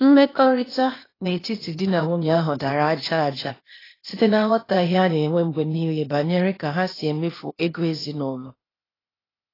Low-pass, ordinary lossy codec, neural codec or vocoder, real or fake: 5.4 kHz; AAC, 24 kbps; codec, 16 kHz, 0.8 kbps, ZipCodec; fake